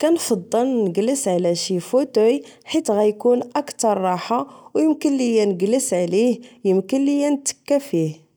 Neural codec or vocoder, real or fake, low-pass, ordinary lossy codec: none; real; none; none